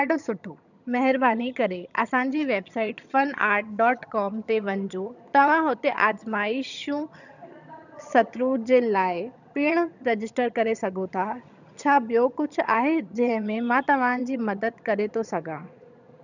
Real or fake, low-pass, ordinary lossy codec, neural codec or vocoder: fake; 7.2 kHz; none; vocoder, 22.05 kHz, 80 mel bands, HiFi-GAN